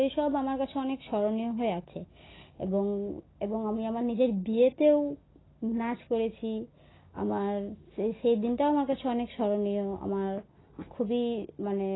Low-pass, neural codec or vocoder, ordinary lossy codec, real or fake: 7.2 kHz; none; AAC, 16 kbps; real